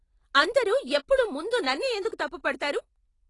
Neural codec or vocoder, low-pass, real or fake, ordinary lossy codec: none; 10.8 kHz; real; AAC, 32 kbps